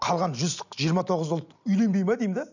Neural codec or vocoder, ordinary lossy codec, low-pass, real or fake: none; none; 7.2 kHz; real